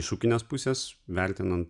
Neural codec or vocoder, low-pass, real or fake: none; 10.8 kHz; real